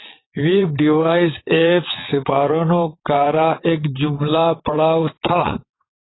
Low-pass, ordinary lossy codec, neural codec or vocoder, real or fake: 7.2 kHz; AAC, 16 kbps; vocoder, 22.05 kHz, 80 mel bands, WaveNeXt; fake